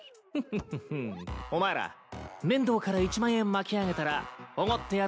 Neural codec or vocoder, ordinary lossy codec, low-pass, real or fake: none; none; none; real